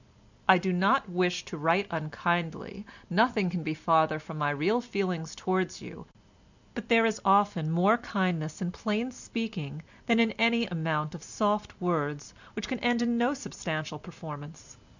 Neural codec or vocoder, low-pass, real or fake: none; 7.2 kHz; real